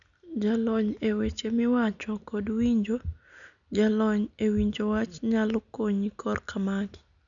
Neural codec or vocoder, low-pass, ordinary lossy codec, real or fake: none; 7.2 kHz; none; real